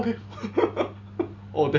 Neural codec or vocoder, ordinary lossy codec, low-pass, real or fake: none; none; 7.2 kHz; real